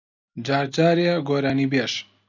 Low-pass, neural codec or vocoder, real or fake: 7.2 kHz; none; real